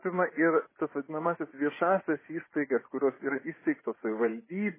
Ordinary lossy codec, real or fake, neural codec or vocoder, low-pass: MP3, 16 kbps; fake; vocoder, 24 kHz, 100 mel bands, Vocos; 3.6 kHz